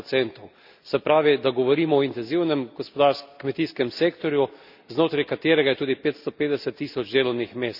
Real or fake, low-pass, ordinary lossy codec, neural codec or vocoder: real; 5.4 kHz; none; none